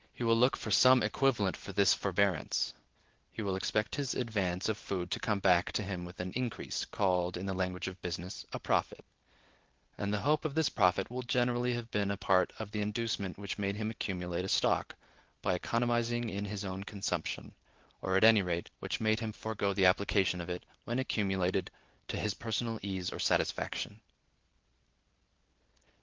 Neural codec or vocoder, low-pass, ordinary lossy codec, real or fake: none; 7.2 kHz; Opus, 16 kbps; real